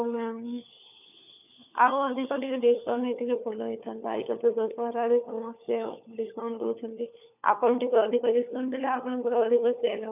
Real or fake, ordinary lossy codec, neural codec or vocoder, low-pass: fake; none; codec, 16 kHz, 4 kbps, FunCodec, trained on LibriTTS, 50 frames a second; 3.6 kHz